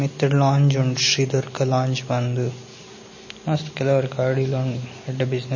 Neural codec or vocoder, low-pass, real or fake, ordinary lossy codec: none; 7.2 kHz; real; MP3, 32 kbps